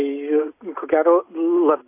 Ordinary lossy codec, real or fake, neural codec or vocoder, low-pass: AAC, 24 kbps; real; none; 3.6 kHz